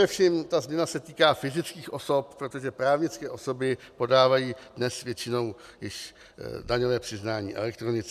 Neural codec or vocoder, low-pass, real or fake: none; 14.4 kHz; real